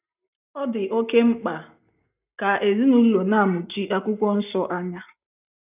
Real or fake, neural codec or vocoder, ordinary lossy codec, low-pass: real; none; none; 3.6 kHz